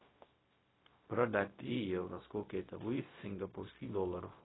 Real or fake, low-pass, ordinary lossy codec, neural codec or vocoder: fake; 7.2 kHz; AAC, 16 kbps; codec, 16 kHz, 0.4 kbps, LongCat-Audio-Codec